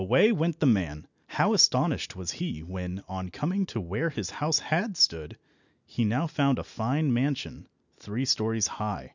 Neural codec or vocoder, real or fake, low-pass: none; real; 7.2 kHz